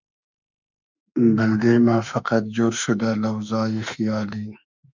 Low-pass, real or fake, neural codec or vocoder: 7.2 kHz; fake; autoencoder, 48 kHz, 32 numbers a frame, DAC-VAE, trained on Japanese speech